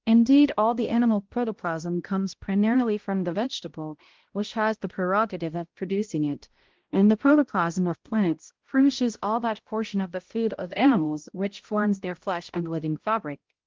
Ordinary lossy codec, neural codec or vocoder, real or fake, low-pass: Opus, 16 kbps; codec, 16 kHz, 0.5 kbps, X-Codec, HuBERT features, trained on balanced general audio; fake; 7.2 kHz